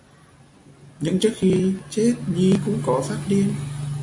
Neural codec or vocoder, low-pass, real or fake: none; 10.8 kHz; real